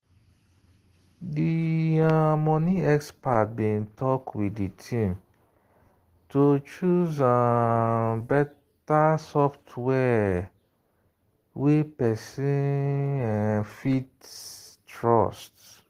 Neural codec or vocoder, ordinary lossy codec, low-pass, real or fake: none; Opus, 16 kbps; 14.4 kHz; real